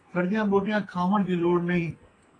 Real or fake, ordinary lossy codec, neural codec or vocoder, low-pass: fake; AAC, 32 kbps; codec, 44.1 kHz, 2.6 kbps, SNAC; 9.9 kHz